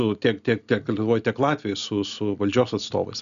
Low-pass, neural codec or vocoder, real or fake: 7.2 kHz; none; real